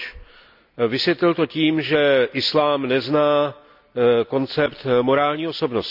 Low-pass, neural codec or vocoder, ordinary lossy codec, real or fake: 5.4 kHz; none; none; real